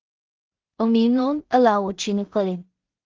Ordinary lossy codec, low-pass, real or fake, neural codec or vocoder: Opus, 16 kbps; 7.2 kHz; fake; codec, 16 kHz in and 24 kHz out, 0.4 kbps, LongCat-Audio-Codec, two codebook decoder